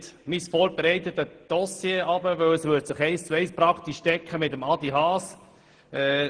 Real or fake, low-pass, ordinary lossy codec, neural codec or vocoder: real; 9.9 kHz; Opus, 16 kbps; none